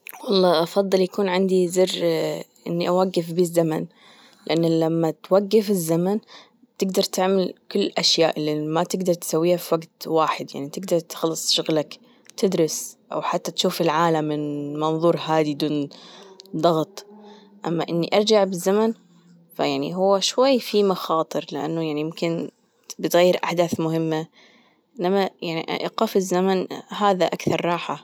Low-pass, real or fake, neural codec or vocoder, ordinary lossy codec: none; real; none; none